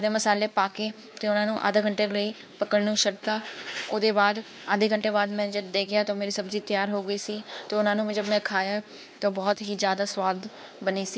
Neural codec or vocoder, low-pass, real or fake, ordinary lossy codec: codec, 16 kHz, 2 kbps, X-Codec, WavLM features, trained on Multilingual LibriSpeech; none; fake; none